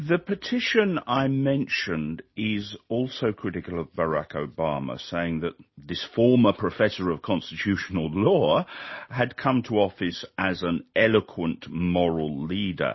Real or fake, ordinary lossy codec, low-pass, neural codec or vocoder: real; MP3, 24 kbps; 7.2 kHz; none